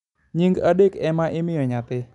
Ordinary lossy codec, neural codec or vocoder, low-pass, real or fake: none; none; 10.8 kHz; real